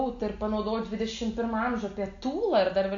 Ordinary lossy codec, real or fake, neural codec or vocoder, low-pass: AAC, 48 kbps; real; none; 7.2 kHz